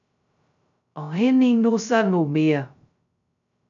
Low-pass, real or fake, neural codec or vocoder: 7.2 kHz; fake; codec, 16 kHz, 0.2 kbps, FocalCodec